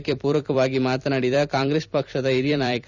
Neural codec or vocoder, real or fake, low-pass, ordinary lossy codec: vocoder, 44.1 kHz, 128 mel bands every 256 samples, BigVGAN v2; fake; 7.2 kHz; none